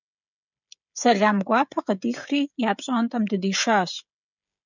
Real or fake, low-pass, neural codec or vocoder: fake; 7.2 kHz; codec, 16 kHz, 16 kbps, FreqCodec, smaller model